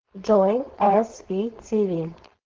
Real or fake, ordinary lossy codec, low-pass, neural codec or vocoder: fake; Opus, 16 kbps; 7.2 kHz; codec, 16 kHz, 4.8 kbps, FACodec